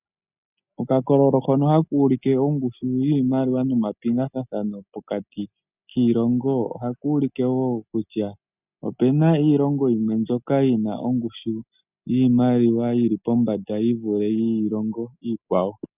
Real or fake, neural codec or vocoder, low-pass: real; none; 3.6 kHz